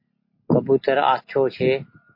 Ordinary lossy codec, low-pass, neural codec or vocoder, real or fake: MP3, 32 kbps; 5.4 kHz; none; real